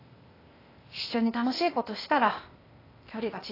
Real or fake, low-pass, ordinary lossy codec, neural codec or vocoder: fake; 5.4 kHz; AAC, 24 kbps; codec, 16 kHz, 0.8 kbps, ZipCodec